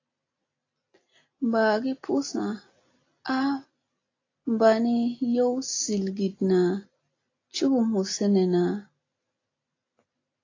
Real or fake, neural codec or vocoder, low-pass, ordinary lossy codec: real; none; 7.2 kHz; AAC, 32 kbps